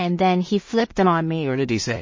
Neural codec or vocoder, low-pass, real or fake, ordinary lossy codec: codec, 16 kHz in and 24 kHz out, 0.4 kbps, LongCat-Audio-Codec, two codebook decoder; 7.2 kHz; fake; MP3, 32 kbps